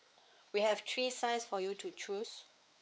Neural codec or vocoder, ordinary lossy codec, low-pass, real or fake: codec, 16 kHz, 4 kbps, X-Codec, WavLM features, trained on Multilingual LibriSpeech; none; none; fake